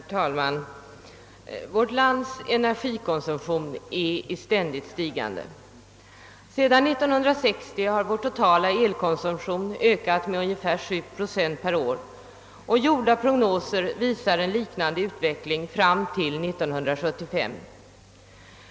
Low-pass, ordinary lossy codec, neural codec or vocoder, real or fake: none; none; none; real